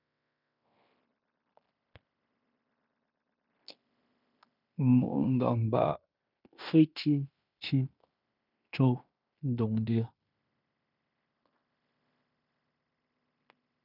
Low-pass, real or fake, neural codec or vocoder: 5.4 kHz; fake; codec, 16 kHz in and 24 kHz out, 0.9 kbps, LongCat-Audio-Codec, fine tuned four codebook decoder